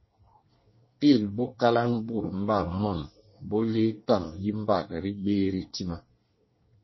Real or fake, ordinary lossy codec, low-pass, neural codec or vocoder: fake; MP3, 24 kbps; 7.2 kHz; codec, 24 kHz, 1 kbps, SNAC